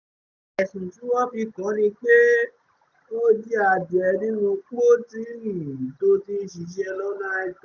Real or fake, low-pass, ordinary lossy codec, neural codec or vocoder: real; 7.2 kHz; none; none